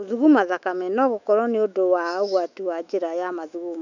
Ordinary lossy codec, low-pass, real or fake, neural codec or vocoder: none; 7.2 kHz; fake; autoencoder, 48 kHz, 128 numbers a frame, DAC-VAE, trained on Japanese speech